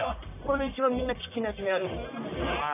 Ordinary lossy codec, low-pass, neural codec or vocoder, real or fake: none; 3.6 kHz; codec, 44.1 kHz, 1.7 kbps, Pupu-Codec; fake